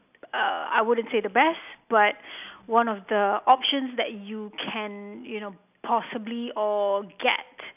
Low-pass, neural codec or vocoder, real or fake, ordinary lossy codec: 3.6 kHz; none; real; none